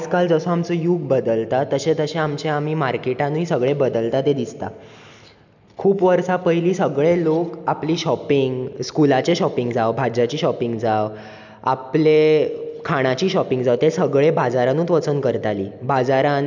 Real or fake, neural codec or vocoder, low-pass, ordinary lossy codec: real; none; 7.2 kHz; none